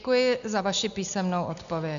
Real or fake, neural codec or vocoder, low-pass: real; none; 7.2 kHz